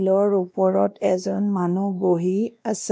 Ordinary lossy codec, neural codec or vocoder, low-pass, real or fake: none; codec, 16 kHz, 1 kbps, X-Codec, WavLM features, trained on Multilingual LibriSpeech; none; fake